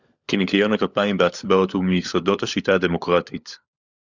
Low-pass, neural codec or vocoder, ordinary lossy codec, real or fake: 7.2 kHz; codec, 16 kHz, 16 kbps, FunCodec, trained on LibriTTS, 50 frames a second; Opus, 64 kbps; fake